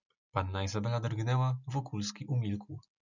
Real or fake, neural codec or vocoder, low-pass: real; none; 7.2 kHz